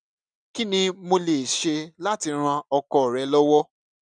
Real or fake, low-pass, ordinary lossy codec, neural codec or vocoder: real; 9.9 kHz; none; none